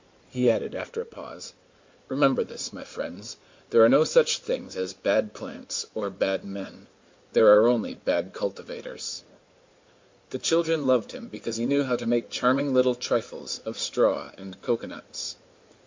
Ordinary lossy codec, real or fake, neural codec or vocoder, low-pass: MP3, 48 kbps; fake; codec, 16 kHz in and 24 kHz out, 2.2 kbps, FireRedTTS-2 codec; 7.2 kHz